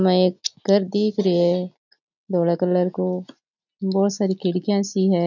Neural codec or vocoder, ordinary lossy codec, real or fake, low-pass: none; none; real; 7.2 kHz